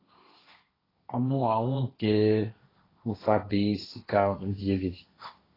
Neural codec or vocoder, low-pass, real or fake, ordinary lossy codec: codec, 16 kHz, 1.1 kbps, Voila-Tokenizer; 5.4 kHz; fake; AAC, 24 kbps